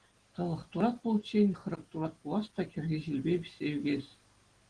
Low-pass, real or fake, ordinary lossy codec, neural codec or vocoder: 9.9 kHz; real; Opus, 16 kbps; none